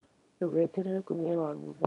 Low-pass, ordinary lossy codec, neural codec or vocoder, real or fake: 10.8 kHz; none; codec, 24 kHz, 0.9 kbps, WavTokenizer, small release; fake